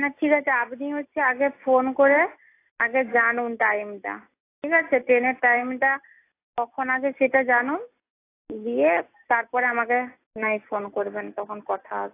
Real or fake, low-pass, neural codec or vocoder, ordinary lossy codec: real; 3.6 kHz; none; AAC, 24 kbps